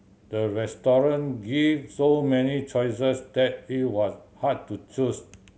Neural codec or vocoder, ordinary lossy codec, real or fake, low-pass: none; none; real; none